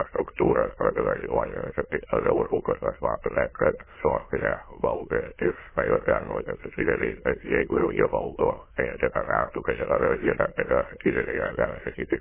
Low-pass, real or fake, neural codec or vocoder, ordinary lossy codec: 3.6 kHz; fake; autoencoder, 22.05 kHz, a latent of 192 numbers a frame, VITS, trained on many speakers; MP3, 16 kbps